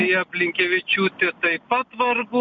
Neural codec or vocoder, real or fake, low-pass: none; real; 7.2 kHz